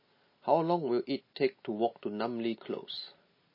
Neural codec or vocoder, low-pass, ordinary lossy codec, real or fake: none; 5.4 kHz; MP3, 24 kbps; real